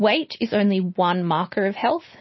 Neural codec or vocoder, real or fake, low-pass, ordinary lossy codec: none; real; 7.2 kHz; MP3, 24 kbps